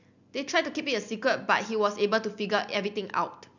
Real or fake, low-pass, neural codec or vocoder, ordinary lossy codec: real; 7.2 kHz; none; none